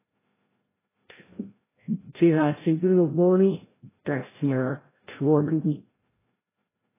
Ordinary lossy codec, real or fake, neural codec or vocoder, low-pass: AAC, 16 kbps; fake; codec, 16 kHz, 0.5 kbps, FreqCodec, larger model; 3.6 kHz